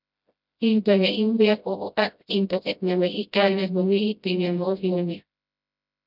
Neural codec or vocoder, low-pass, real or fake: codec, 16 kHz, 0.5 kbps, FreqCodec, smaller model; 5.4 kHz; fake